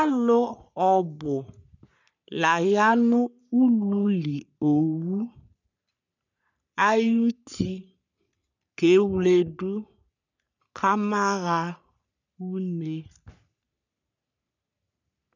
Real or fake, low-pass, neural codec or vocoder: fake; 7.2 kHz; codec, 44.1 kHz, 3.4 kbps, Pupu-Codec